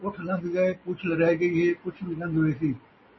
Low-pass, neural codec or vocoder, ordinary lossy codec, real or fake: 7.2 kHz; none; MP3, 24 kbps; real